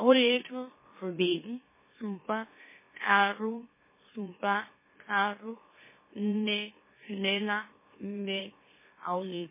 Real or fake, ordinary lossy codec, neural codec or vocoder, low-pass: fake; MP3, 16 kbps; autoencoder, 44.1 kHz, a latent of 192 numbers a frame, MeloTTS; 3.6 kHz